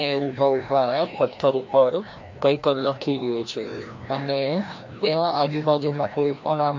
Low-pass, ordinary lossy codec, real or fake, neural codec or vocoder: 7.2 kHz; MP3, 48 kbps; fake; codec, 16 kHz, 1 kbps, FreqCodec, larger model